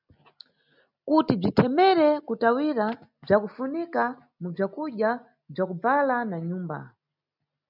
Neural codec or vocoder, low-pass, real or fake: none; 5.4 kHz; real